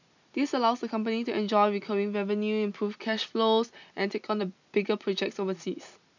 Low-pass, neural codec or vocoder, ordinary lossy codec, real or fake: 7.2 kHz; none; none; real